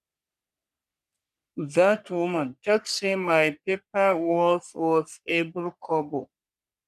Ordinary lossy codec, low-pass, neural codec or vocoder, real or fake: none; 14.4 kHz; codec, 44.1 kHz, 3.4 kbps, Pupu-Codec; fake